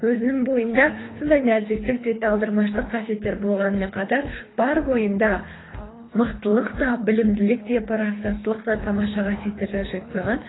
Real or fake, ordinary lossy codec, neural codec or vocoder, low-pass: fake; AAC, 16 kbps; codec, 24 kHz, 3 kbps, HILCodec; 7.2 kHz